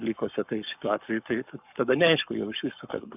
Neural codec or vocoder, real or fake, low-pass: codec, 44.1 kHz, 7.8 kbps, Pupu-Codec; fake; 3.6 kHz